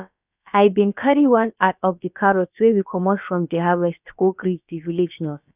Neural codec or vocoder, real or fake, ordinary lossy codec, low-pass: codec, 16 kHz, about 1 kbps, DyCAST, with the encoder's durations; fake; none; 3.6 kHz